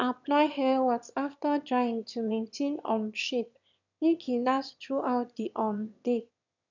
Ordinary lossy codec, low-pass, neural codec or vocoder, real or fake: none; 7.2 kHz; autoencoder, 22.05 kHz, a latent of 192 numbers a frame, VITS, trained on one speaker; fake